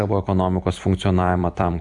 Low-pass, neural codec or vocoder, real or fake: 10.8 kHz; vocoder, 44.1 kHz, 128 mel bands every 256 samples, BigVGAN v2; fake